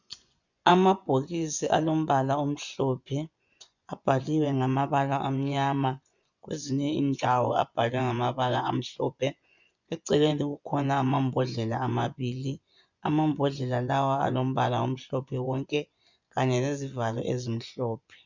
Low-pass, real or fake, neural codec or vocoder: 7.2 kHz; fake; vocoder, 24 kHz, 100 mel bands, Vocos